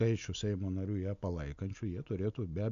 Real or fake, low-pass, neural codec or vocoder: real; 7.2 kHz; none